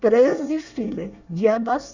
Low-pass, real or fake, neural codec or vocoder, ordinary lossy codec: 7.2 kHz; fake; codec, 24 kHz, 1 kbps, SNAC; none